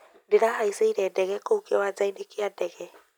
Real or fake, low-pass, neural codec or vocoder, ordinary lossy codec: real; none; none; none